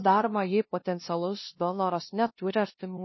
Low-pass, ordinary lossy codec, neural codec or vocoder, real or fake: 7.2 kHz; MP3, 24 kbps; codec, 16 kHz, 0.5 kbps, FunCodec, trained on LibriTTS, 25 frames a second; fake